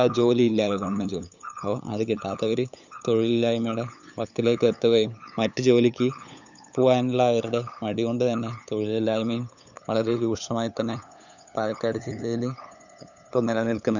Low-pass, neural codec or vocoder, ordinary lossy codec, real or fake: 7.2 kHz; codec, 16 kHz, 8 kbps, FunCodec, trained on LibriTTS, 25 frames a second; none; fake